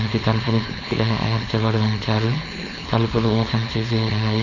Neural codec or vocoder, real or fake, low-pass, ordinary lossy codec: codec, 16 kHz, 4.8 kbps, FACodec; fake; 7.2 kHz; none